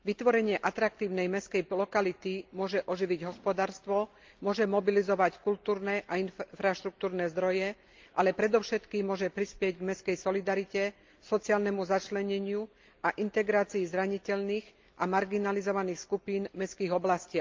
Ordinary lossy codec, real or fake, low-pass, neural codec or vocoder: Opus, 24 kbps; real; 7.2 kHz; none